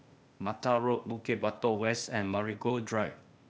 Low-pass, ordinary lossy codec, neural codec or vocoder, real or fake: none; none; codec, 16 kHz, 0.8 kbps, ZipCodec; fake